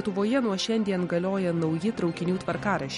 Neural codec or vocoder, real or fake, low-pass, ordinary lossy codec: none; real; 14.4 kHz; MP3, 48 kbps